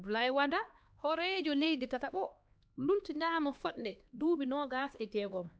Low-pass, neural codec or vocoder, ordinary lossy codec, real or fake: none; codec, 16 kHz, 2 kbps, X-Codec, HuBERT features, trained on LibriSpeech; none; fake